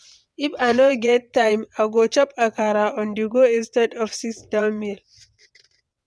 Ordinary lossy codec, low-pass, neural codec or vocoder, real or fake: none; none; vocoder, 22.05 kHz, 80 mel bands, WaveNeXt; fake